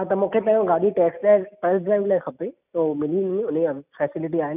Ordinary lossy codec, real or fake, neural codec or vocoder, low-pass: none; real; none; 3.6 kHz